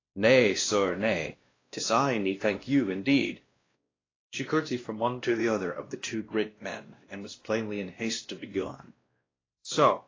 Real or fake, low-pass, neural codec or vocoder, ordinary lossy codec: fake; 7.2 kHz; codec, 16 kHz, 1 kbps, X-Codec, WavLM features, trained on Multilingual LibriSpeech; AAC, 32 kbps